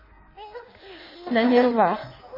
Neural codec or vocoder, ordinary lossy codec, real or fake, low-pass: codec, 16 kHz in and 24 kHz out, 1.1 kbps, FireRedTTS-2 codec; MP3, 24 kbps; fake; 5.4 kHz